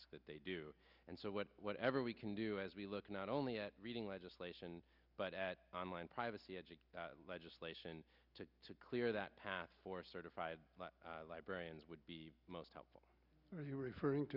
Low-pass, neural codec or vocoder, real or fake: 5.4 kHz; none; real